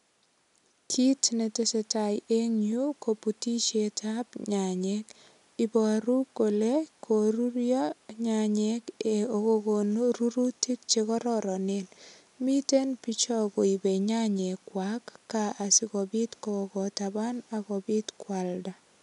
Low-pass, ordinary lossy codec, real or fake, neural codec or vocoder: 10.8 kHz; none; real; none